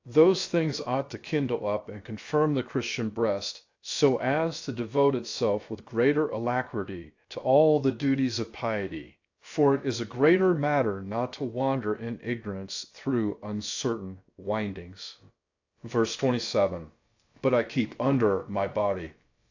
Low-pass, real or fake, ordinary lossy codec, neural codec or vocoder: 7.2 kHz; fake; AAC, 48 kbps; codec, 16 kHz, about 1 kbps, DyCAST, with the encoder's durations